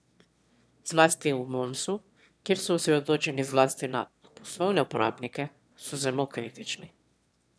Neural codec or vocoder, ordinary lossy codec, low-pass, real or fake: autoencoder, 22.05 kHz, a latent of 192 numbers a frame, VITS, trained on one speaker; none; none; fake